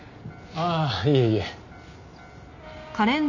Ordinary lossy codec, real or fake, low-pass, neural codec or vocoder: none; real; 7.2 kHz; none